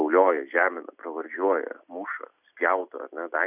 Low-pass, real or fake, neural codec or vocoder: 3.6 kHz; real; none